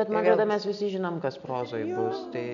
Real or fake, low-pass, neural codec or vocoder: real; 7.2 kHz; none